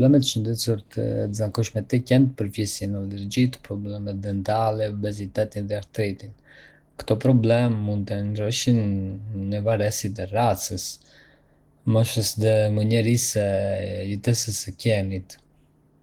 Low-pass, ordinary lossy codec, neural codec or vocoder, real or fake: 19.8 kHz; Opus, 16 kbps; none; real